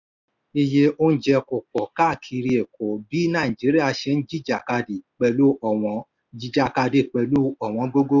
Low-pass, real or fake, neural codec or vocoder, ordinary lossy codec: 7.2 kHz; real; none; none